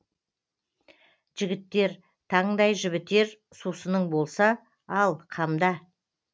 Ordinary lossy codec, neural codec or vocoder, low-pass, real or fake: none; none; none; real